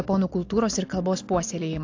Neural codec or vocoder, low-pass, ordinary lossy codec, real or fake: none; 7.2 kHz; AAC, 48 kbps; real